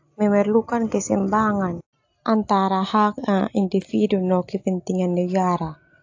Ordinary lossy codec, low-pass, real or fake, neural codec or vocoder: AAC, 48 kbps; 7.2 kHz; real; none